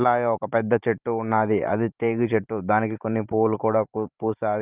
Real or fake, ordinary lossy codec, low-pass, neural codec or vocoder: fake; Opus, 32 kbps; 3.6 kHz; vocoder, 44.1 kHz, 128 mel bands every 512 samples, BigVGAN v2